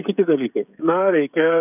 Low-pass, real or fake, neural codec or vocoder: 3.6 kHz; fake; codec, 16 kHz, 16 kbps, FreqCodec, smaller model